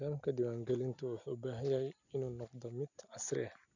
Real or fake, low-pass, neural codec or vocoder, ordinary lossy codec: real; 7.2 kHz; none; none